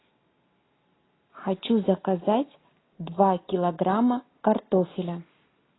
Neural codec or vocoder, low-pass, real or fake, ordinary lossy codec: none; 7.2 kHz; real; AAC, 16 kbps